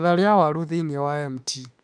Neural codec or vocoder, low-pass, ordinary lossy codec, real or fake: autoencoder, 48 kHz, 32 numbers a frame, DAC-VAE, trained on Japanese speech; 9.9 kHz; none; fake